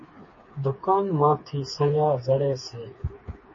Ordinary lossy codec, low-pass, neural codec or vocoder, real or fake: MP3, 32 kbps; 7.2 kHz; codec, 16 kHz, 4 kbps, FreqCodec, smaller model; fake